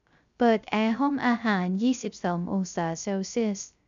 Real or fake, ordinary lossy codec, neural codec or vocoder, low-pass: fake; MP3, 96 kbps; codec, 16 kHz, 0.3 kbps, FocalCodec; 7.2 kHz